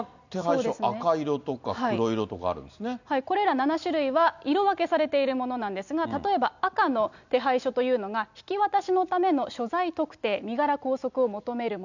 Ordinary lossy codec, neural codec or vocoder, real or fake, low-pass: none; none; real; 7.2 kHz